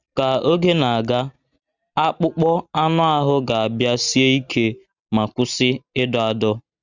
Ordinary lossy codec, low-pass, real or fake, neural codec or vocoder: Opus, 64 kbps; 7.2 kHz; real; none